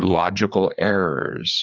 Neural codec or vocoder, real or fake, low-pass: codec, 16 kHz, 4 kbps, FreqCodec, larger model; fake; 7.2 kHz